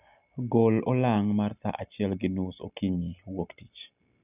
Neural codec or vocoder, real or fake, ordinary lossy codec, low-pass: none; real; none; 3.6 kHz